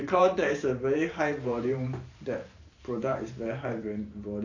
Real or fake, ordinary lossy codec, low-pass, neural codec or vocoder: fake; AAC, 48 kbps; 7.2 kHz; vocoder, 44.1 kHz, 128 mel bands every 512 samples, BigVGAN v2